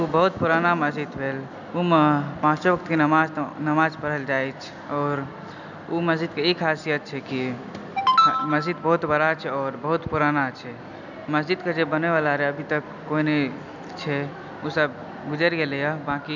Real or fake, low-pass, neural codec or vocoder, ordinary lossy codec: real; 7.2 kHz; none; none